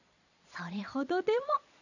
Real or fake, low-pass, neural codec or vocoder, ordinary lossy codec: fake; 7.2 kHz; vocoder, 22.05 kHz, 80 mel bands, Vocos; none